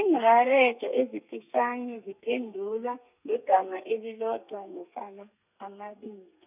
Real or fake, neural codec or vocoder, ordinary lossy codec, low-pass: fake; codec, 32 kHz, 1.9 kbps, SNAC; none; 3.6 kHz